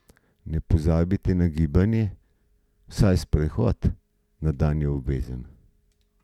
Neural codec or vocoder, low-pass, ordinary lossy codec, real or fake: none; 19.8 kHz; none; real